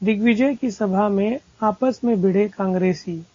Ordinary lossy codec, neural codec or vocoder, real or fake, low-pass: AAC, 32 kbps; none; real; 7.2 kHz